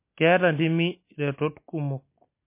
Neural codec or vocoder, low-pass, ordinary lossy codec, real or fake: none; 3.6 kHz; MP3, 24 kbps; real